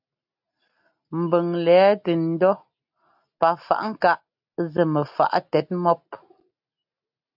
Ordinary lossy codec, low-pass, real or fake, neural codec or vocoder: AAC, 48 kbps; 5.4 kHz; real; none